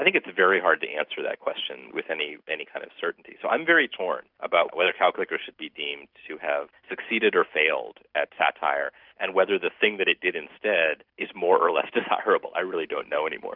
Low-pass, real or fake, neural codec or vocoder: 5.4 kHz; real; none